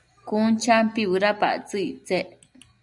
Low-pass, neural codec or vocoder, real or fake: 10.8 kHz; none; real